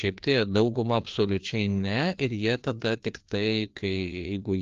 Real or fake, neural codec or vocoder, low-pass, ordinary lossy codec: fake; codec, 16 kHz, 2 kbps, FreqCodec, larger model; 7.2 kHz; Opus, 32 kbps